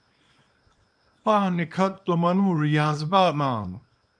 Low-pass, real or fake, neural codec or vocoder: 9.9 kHz; fake; codec, 24 kHz, 0.9 kbps, WavTokenizer, small release